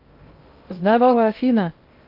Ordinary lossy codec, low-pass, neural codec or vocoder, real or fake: Opus, 32 kbps; 5.4 kHz; codec, 16 kHz in and 24 kHz out, 0.6 kbps, FocalCodec, streaming, 2048 codes; fake